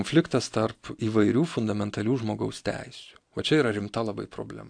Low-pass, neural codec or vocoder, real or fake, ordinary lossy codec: 9.9 kHz; none; real; AAC, 64 kbps